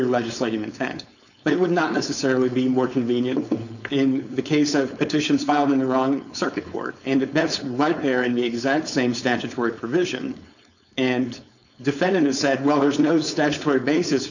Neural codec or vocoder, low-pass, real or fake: codec, 16 kHz, 4.8 kbps, FACodec; 7.2 kHz; fake